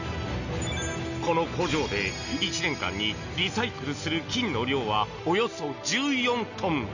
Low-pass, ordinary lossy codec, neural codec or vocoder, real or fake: 7.2 kHz; none; none; real